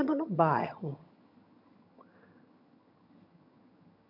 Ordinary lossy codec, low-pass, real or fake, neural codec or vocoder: MP3, 48 kbps; 5.4 kHz; fake; vocoder, 22.05 kHz, 80 mel bands, HiFi-GAN